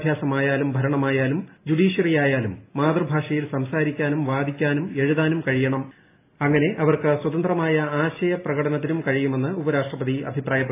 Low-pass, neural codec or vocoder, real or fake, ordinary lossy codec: 3.6 kHz; none; real; AAC, 24 kbps